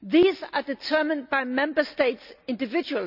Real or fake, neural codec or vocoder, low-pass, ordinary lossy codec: real; none; 5.4 kHz; none